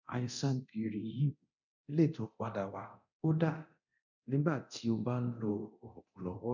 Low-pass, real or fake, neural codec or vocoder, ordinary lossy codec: 7.2 kHz; fake; codec, 24 kHz, 0.9 kbps, DualCodec; none